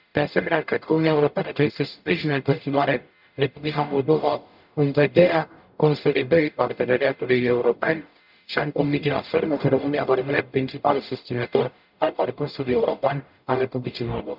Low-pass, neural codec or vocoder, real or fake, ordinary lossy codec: 5.4 kHz; codec, 44.1 kHz, 0.9 kbps, DAC; fake; none